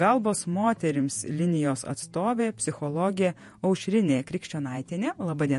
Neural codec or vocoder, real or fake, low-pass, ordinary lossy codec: vocoder, 48 kHz, 128 mel bands, Vocos; fake; 14.4 kHz; MP3, 48 kbps